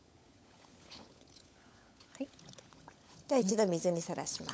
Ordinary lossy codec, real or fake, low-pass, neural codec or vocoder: none; fake; none; codec, 16 kHz, 16 kbps, FunCodec, trained on LibriTTS, 50 frames a second